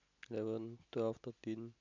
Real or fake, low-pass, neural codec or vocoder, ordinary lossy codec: real; 7.2 kHz; none; none